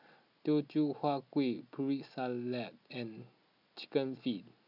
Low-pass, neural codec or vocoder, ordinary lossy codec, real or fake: 5.4 kHz; none; none; real